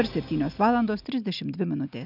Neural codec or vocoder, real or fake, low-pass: none; real; 5.4 kHz